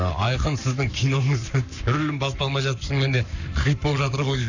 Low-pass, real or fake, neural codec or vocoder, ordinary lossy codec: 7.2 kHz; fake; codec, 44.1 kHz, 7.8 kbps, Pupu-Codec; none